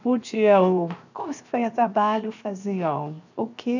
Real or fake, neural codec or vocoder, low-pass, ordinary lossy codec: fake; codec, 16 kHz, 0.7 kbps, FocalCodec; 7.2 kHz; none